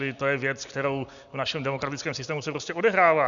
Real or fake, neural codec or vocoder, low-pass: real; none; 7.2 kHz